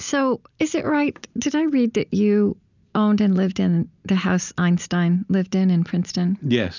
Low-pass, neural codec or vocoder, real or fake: 7.2 kHz; none; real